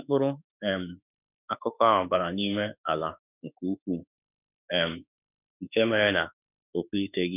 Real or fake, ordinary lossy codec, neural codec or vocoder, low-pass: fake; none; autoencoder, 48 kHz, 32 numbers a frame, DAC-VAE, trained on Japanese speech; 3.6 kHz